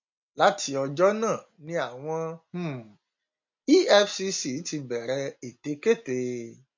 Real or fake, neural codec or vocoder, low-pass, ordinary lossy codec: real; none; 7.2 kHz; MP3, 48 kbps